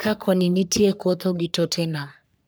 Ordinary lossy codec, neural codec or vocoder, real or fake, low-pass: none; codec, 44.1 kHz, 3.4 kbps, Pupu-Codec; fake; none